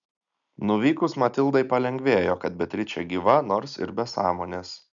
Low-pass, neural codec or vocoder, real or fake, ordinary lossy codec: 7.2 kHz; none; real; MP3, 96 kbps